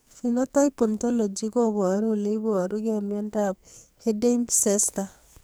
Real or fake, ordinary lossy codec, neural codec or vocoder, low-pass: fake; none; codec, 44.1 kHz, 2.6 kbps, SNAC; none